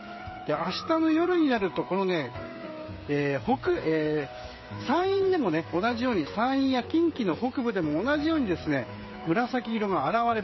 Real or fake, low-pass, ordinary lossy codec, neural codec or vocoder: fake; 7.2 kHz; MP3, 24 kbps; codec, 16 kHz, 8 kbps, FreqCodec, smaller model